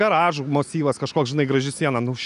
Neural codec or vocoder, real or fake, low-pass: none; real; 10.8 kHz